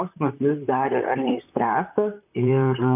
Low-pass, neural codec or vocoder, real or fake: 3.6 kHz; vocoder, 44.1 kHz, 128 mel bands, Pupu-Vocoder; fake